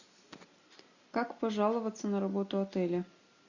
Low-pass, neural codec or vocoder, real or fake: 7.2 kHz; none; real